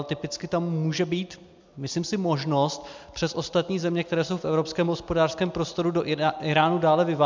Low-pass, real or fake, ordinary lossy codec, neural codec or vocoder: 7.2 kHz; real; MP3, 64 kbps; none